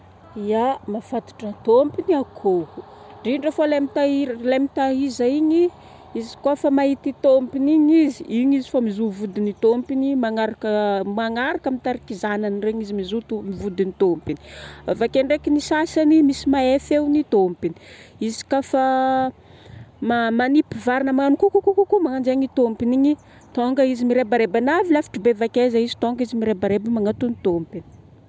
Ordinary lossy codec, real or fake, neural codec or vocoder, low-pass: none; real; none; none